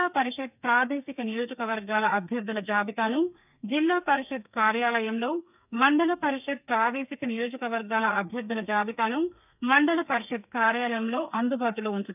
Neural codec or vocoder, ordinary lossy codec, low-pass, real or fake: codec, 32 kHz, 1.9 kbps, SNAC; none; 3.6 kHz; fake